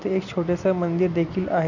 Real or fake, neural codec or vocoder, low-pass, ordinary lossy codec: real; none; 7.2 kHz; none